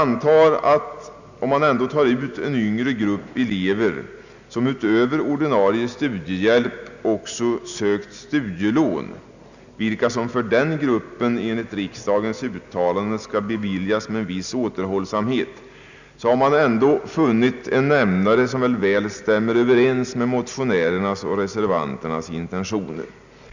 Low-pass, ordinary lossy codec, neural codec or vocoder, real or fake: 7.2 kHz; none; none; real